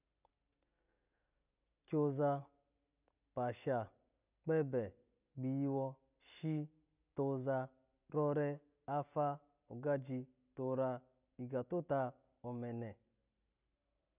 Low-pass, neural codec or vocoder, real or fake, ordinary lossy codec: 3.6 kHz; none; real; none